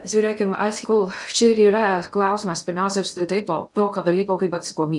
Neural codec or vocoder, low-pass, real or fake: codec, 16 kHz in and 24 kHz out, 0.6 kbps, FocalCodec, streaming, 2048 codes; 10.8 kHz; fake